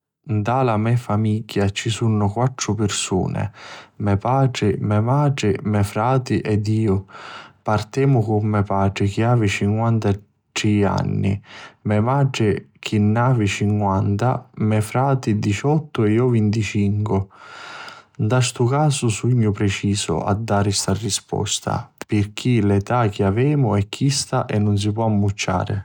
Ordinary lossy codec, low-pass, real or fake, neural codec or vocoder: none; 19.8 kHz; real; none